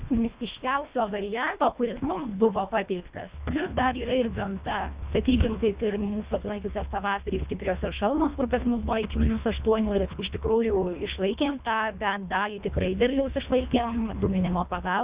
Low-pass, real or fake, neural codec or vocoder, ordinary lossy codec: 3.6 kHz; fake; codec, 24 kHz, 1.5 kbps, HILCodec; Opus, 64 kbps